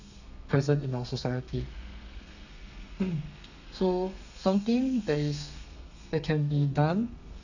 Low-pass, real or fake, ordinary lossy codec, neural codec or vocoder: 7.2 kHz; fake; none; codec, 32 kHz, 1.9 kbps, SNAC